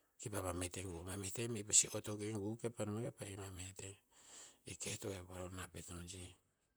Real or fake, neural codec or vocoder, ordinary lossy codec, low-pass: fake; vocoder, 44.1 kHz, 128 mel bands, Pupu-Vocoder; none; none